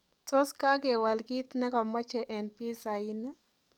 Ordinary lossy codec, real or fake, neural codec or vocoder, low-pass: none; fake; codec, 44.1 kHz, 7.8 kbps, DAC; 19.8 kHz